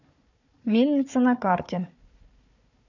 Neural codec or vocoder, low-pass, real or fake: codec, 16 kHz, 4 kbps, FunCodec, trained on Chinese and English, 50 frames a second; 7.2 kHz; fake